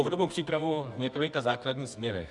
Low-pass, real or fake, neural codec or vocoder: 10.8 kHz; fake; codec, 24 kHz, 0.9 kbps, WavTokenizer, medium music audio release